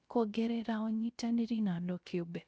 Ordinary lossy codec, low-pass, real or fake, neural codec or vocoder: none; none; fake; codec, 16 kHz, 0.3 kbps, FocalCodec